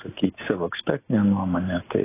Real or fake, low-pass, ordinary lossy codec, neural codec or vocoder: real; 3.6 kHz; AAC, 16 kbps; none